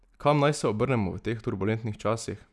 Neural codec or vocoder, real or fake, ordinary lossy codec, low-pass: none; real; none; none